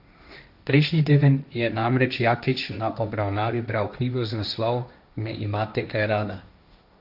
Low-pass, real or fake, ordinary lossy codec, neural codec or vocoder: 5.4 kHz; fake; none; codec, 16 kHz, 1.1 kbps, Voila-Tokenizer